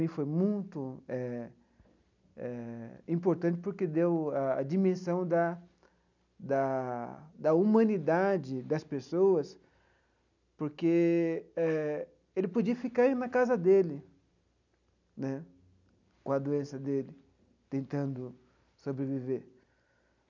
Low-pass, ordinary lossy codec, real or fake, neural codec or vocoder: 7.2 kHz; none; real; none